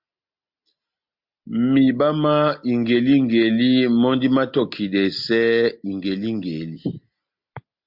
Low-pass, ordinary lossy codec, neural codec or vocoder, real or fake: 5.4 kHz; MP3, 48 kbps; none; real